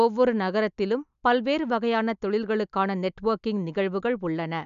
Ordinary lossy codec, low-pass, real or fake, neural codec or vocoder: none; 7.2 kHz; real; none